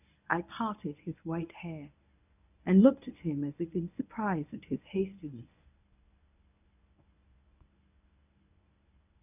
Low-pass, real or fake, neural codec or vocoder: 3.6 kHz; fake; codec, 24 kHz, 0.9 kbps, WavTokenizer, medium speech release version 1